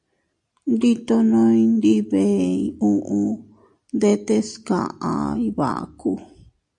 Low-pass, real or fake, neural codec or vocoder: 10.8 kHz; real; none